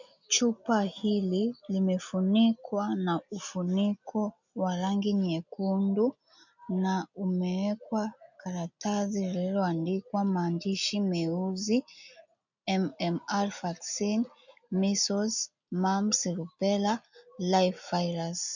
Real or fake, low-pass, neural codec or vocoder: real; 7.2 kHz; none